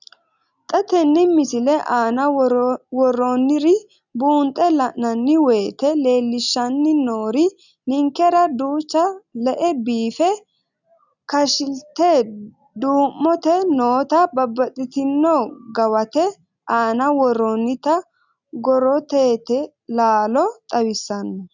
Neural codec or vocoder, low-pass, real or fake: none; 7.2 kHz; real